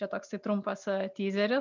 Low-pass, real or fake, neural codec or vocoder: 7.2 kHz; real; none